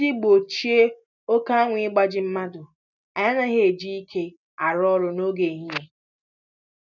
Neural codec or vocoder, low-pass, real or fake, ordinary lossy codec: none; 7.2 kHz; real; none